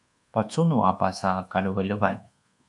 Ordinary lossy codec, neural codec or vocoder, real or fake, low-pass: AAC, 64 kbps; codec, 24 kHz, 1.2 kbps, DualCodec; fake; 10.8 kHz